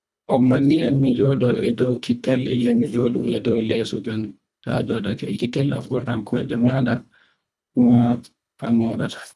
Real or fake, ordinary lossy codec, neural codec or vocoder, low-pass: fake; none; codec, 24 kHz, 1.5 kbps, HILCodec; none